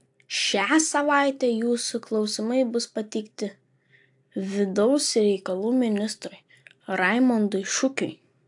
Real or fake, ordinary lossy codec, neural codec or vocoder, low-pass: real; AAC, 64 kbps; none; 10.8 kHz